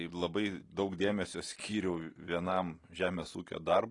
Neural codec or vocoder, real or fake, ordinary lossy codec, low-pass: none; real; AAC, 32 kbps; 10.8 kHz